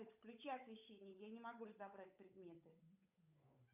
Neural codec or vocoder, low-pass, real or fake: codec, 16 kHz, 8 kbps, FunCodec, trained on Chinese and English, 25 frames a second; 3.6 kHz; fake